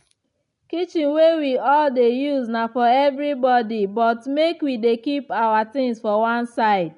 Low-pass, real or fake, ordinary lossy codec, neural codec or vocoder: 10.8 kHz; real; none; none